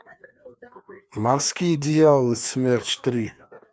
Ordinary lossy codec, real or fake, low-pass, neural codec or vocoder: none; fake; none; codec, 16 kHz, 2 kbps, FreqCodec, larger model